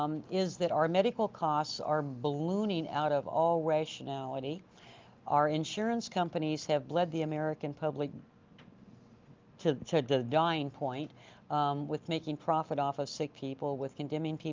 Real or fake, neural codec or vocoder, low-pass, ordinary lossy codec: real; none; 7.2 kHz; Opus, 32 kbps